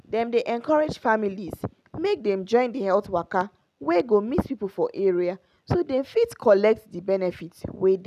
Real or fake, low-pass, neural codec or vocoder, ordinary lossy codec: real; 14.4 kHz; none; none